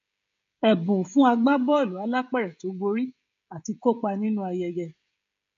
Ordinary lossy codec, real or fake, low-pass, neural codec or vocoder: MP3, 48 kbps; fake; 7.2 kHz; codec, 16 kHz, 16 kbps, FreqCodec, smaller model